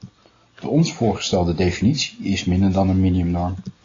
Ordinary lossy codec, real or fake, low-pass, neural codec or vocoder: AAC, 32 kbps; real; 7.2 kHz; none